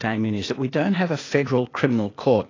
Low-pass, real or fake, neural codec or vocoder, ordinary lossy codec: 7.2 kHz; fake; codec, 16 kHz, 0.8 kbps, ZipCodec; AAC, 32 kbps